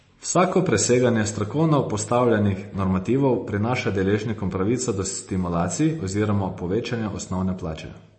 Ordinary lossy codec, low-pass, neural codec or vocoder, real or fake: MP3, 32 kbps; 10.8 kHz; none; real